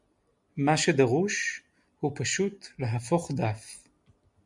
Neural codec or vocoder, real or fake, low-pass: none; real; 10.8 kHz